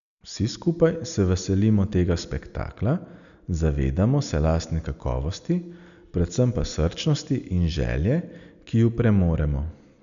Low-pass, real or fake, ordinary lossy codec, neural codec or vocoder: 7.2 kHz; real; none; none